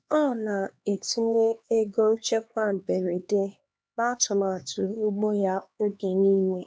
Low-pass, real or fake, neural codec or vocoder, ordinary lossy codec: none; fake; codec, 16 kHz, 2 kbps, X-Codec, HuBERT features, trained on LibriSpeech; none